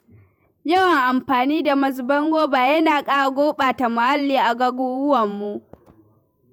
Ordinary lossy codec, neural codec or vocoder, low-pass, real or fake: none; vocoder, 48 kHz, 128 mel bands, Vocos; none; fake